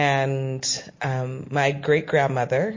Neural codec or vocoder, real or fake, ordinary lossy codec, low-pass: none; real; MP3, 32 kbps; 7.2 kHz